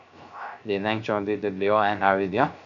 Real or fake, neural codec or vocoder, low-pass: fake; codec, 16 kHz, 0.3 kbps, FocalCodec; 7.2 kHz